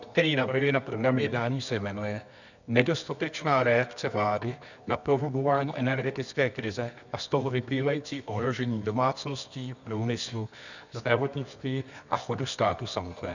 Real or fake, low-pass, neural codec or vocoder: fake; 7.2 kHz; codec, 24 kHz, 0.9 kbps, WavTokenizer, medium music audio release